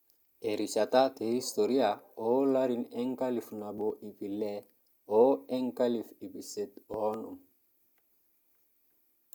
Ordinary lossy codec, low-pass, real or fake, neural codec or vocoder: none; none; real; none